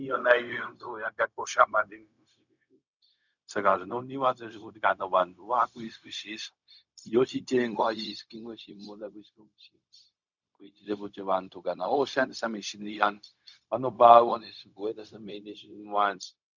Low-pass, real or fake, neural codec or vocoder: 7.2 kHz; fake; codec, 16 kHz, 0.4 kbps, LongCat-Audio-Codec